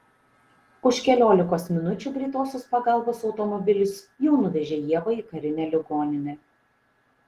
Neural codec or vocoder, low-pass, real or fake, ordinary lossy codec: none; 14.4 kHz; real; Opus, 16 kbps